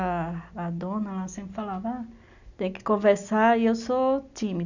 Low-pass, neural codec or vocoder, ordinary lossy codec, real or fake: 7.2 kHz; none; none; real